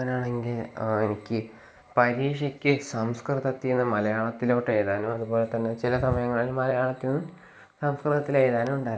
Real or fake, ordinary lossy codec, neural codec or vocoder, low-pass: real; none; none; none